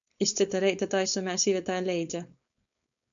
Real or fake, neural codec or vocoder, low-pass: fake; codec, 16 kHz, 4.8 kbps, FACodec; 7.2 kHz